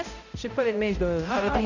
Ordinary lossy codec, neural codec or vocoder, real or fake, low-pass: none; codec, 16 kHz, 0.5 kbps, X-Codec, HuBERT features, trained on balanced general audio; fake; 7.2 kHz